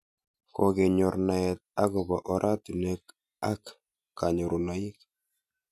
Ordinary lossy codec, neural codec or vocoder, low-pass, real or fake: none; none; 14.4 kHz; real